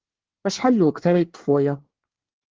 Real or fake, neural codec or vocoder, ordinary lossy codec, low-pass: fake; codec, 24 kHz, 1 kbps, SNAC; Opus, 16 kbps; 7.2 kHz